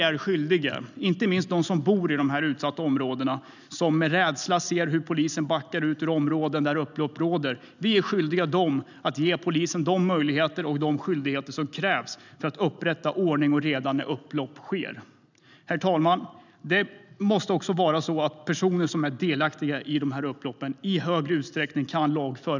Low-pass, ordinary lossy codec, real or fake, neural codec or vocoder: 7.2 kHz; none; real; none